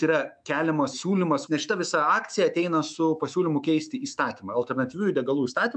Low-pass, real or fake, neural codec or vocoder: 9.9 kHz; fake; autoencoder, 48 kHz, 128 numbers a frame, DAC-VAE, trained on Japanese speech